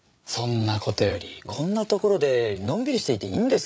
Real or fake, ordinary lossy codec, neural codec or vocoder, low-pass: fake; none; codec, 16 kHz, 8 kbps, FreqCodec, larger model; none